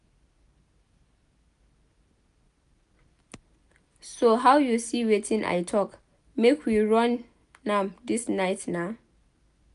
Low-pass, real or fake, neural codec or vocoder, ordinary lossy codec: 10.8 kHz; real; none; none